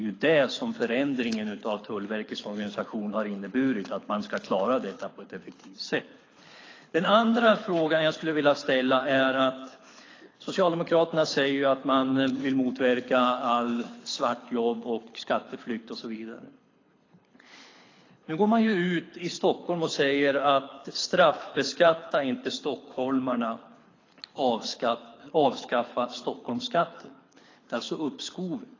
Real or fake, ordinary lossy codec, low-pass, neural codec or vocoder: fake; AAC, 32 kbps; 7.2 kHz; codec, 24 kHz, 6 kbps, HILCodec